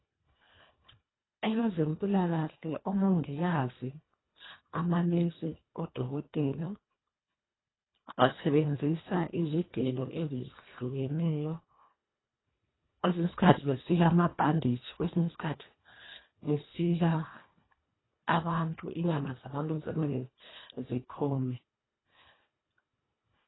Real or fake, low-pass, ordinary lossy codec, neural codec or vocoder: fake; 7.2 kHz; AAC, 16 kbps; codec, 24 kHz, 1.5 kbps, HILCodec